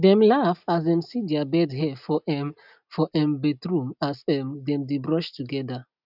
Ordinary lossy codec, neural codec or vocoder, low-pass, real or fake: none; none; 5.4 kHz; real